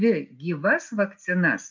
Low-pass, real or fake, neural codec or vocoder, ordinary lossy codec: 7.2 kHz; real; none; MP3, 64 kbps